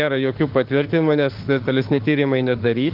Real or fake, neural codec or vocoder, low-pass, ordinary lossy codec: fake; autoencoder, 48 kHz, 32 numbers a frame, DAC-VAE, trained on Japanese speech; 5.4 kHz; Opus, 16 kbps